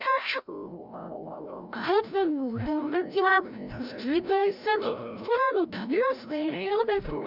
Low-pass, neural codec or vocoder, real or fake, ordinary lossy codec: 5.4 kHz; codec, 16 kHz, 0.5 kbps, FreqCodec, larger model; fake; none